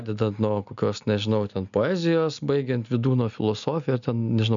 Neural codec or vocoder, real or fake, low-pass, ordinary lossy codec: none; real; 7.2 kHz; MP3, 96 kbps